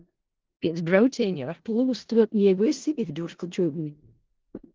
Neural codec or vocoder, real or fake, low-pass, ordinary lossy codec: codec, 16 kHz in and 24 kHz out, 0.4 kbps, LongCat-Audio-Codec, four codebook decoder; fake; 7.2 kHz; Opus, 16 kbps